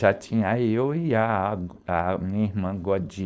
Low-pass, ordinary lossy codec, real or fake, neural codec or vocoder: none; none; fake; codec, 16 kHz, 4.8 kbps, FACodec